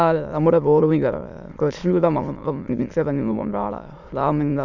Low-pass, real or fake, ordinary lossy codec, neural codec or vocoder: 7.2 kHz; fake; none; autoencoder, 22.05 kHz, a latent of 192 numbers a frame, VITS, trained on many speakers